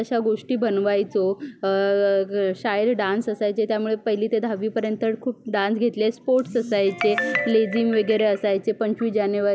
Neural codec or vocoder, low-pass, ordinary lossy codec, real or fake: none; none; none; real